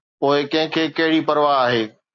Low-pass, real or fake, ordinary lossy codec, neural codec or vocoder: 7.2 kHz; real; AAC, 48 kbps; none